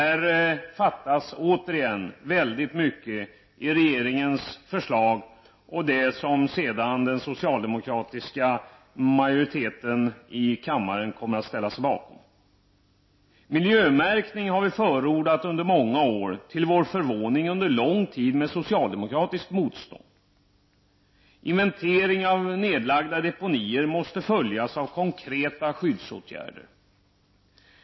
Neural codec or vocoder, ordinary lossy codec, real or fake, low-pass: none; MP3, 24 kbps; real; 7.2 kHz